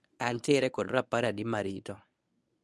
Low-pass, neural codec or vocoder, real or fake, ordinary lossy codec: none; codec, 24 kHz, 0.9 kbps, WavTokenizer, medium speech release version 1; fake; none